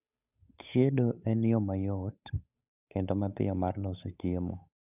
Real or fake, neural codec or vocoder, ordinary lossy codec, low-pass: fake; codec, 16 kHz, 8 kbps, FunCodec, trained on Chinese and English, 25 frames a second; none; 3.6 kHz